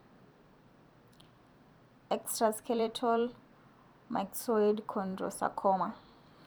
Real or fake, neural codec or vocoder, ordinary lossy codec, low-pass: fake; vocoder, 44.1 kHz, 128 mel bands every 256 samples, BigVGAN v2; none; none